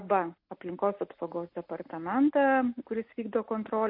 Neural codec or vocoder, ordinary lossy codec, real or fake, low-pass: none; MP3, 32 kbps; real; 5.4 kHz